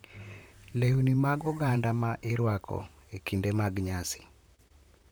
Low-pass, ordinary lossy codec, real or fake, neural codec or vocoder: none; none; fake; vocoder, 44.1 kHz, 128 mel bands, Pupu-Vocoder